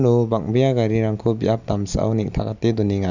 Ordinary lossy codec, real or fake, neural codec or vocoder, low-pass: none; real; none; 7.2 kHz